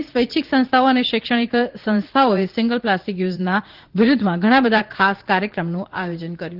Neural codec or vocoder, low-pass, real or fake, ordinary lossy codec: vocoder, 44.1 kHz, 80 mel bands, Vocos; 5.4 kHz; fake; Opus, 16 kbps